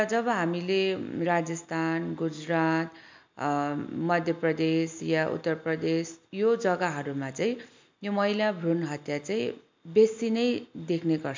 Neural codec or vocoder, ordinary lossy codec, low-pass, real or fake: none; MP3, 64 kbps; 7.2 kHz; real